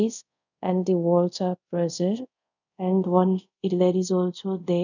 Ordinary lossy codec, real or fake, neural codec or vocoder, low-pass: none; fake; codec, 24 kHz, 0.5 kbps, DualCodec; 7.2 kHz